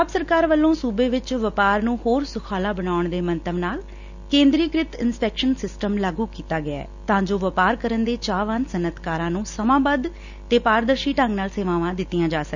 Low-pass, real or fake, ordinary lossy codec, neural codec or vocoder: 7.2 kHz; real; none; none